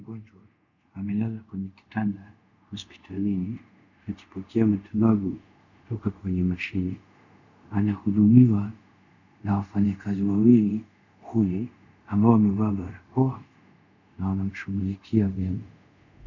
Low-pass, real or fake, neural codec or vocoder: 7.2 kHz; fake; codec, 24 kHz, 0.5 kbps, DualCodec